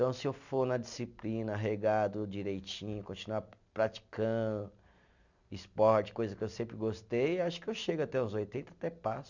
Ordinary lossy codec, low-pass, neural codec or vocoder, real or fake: none; 7.2 kHz; none; real